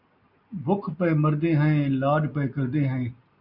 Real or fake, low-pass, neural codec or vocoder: real; 5.4 kHz; none